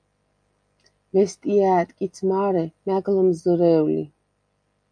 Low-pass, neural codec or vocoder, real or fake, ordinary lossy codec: 9.9 kHz; none; real; MP3, 64 kbps